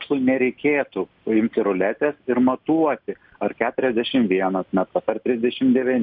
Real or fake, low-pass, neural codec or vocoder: real; 5.4 kHz; none